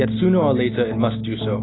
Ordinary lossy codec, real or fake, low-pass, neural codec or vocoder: AAC, 16 kbps; real; 7.2 kHz; none